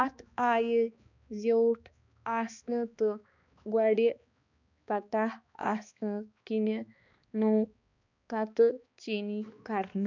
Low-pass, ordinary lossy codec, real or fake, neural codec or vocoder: 7.2 kHz; none; fake; codec, 16 kHz, 2 kbps, X-Codec, HuBERT features, trained on balanced general audio